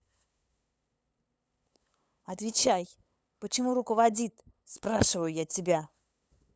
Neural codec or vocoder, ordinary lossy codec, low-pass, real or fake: codec, 16 kHz, 8 kbps, FunCodec, trained on LibriTTS, 25 frames a second; none; none; fake